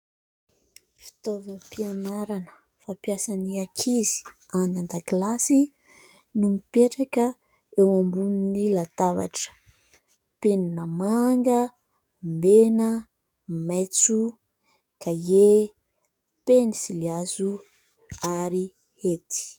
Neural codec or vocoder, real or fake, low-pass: codec, 44.1 kHz, 7.8 kbps, DAC; fake; 19.8 kHz